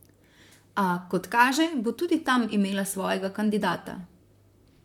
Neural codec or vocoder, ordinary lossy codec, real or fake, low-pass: vocoder, 44.1 kHz, 128 mel bands, Pupu-Vocoder; none; fake; 19.8 kHz